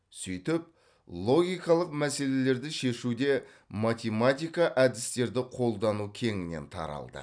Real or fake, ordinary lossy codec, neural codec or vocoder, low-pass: real; none; none; 9.9 kHz